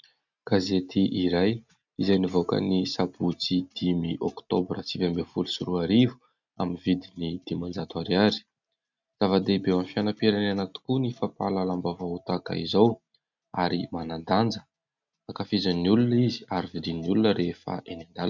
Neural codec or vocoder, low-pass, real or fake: none; 7.2 kHz; real